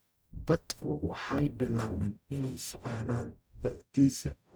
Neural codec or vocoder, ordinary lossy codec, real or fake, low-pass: codec, 44.1 kHz, 0.9 kbps, DAC; none; fake; none